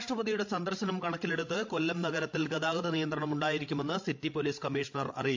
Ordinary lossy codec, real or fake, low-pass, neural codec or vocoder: MP3, 48 kbps; fake; 7.2 kHz; codec, 16 kHz, 16 kbps, FreqCodec, larger model